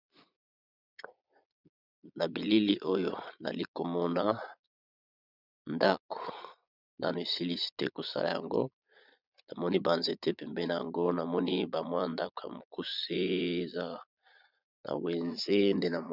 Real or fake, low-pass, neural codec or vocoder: fake; 5.4 kHz; vocoder, 22.05 kHz, 80 mel bands, Vocos